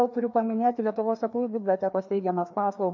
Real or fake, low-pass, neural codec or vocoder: fake; 7.2 kHz; codec, 16 kHz, 2 kbps, FreqCodec, larger model